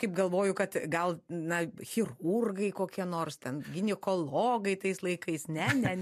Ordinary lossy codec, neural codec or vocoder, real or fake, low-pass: MP3, 64 kbps; none; real; 14.4 kHz